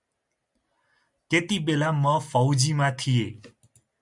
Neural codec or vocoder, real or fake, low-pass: none; real; 10.8 kHz